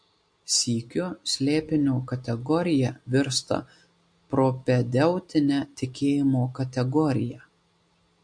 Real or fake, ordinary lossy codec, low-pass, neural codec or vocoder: real; MP3, 48 kbps; 9.9 kHz; none